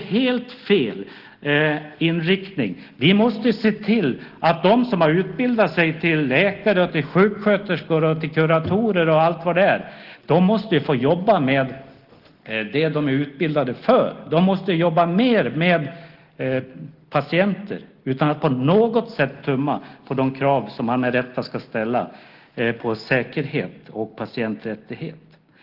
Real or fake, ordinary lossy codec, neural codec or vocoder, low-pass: real; Opus, 16 kbps; none; 5.4 kHz